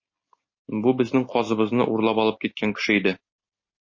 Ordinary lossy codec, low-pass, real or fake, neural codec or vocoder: MP3, 32 kbps; 7.2 kHz; real; none